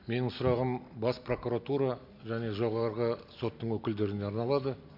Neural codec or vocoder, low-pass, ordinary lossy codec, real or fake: none; 5.4 kHz; MP3, 48 kbps; real